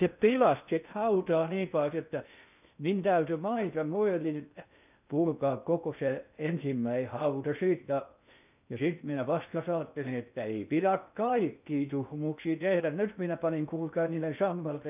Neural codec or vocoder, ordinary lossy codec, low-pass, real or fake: codec, 16 kHz in and 24 kHz out, 0.6 kbps, FocalCodec, streaming, 2048 codes; none; 3.6 kHz; fake